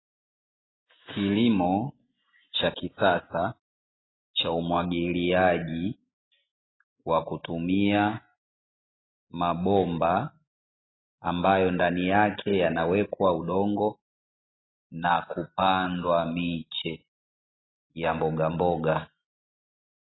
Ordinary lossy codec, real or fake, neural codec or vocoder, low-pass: AAC, 16 kbps; real; none; 7.2 kHz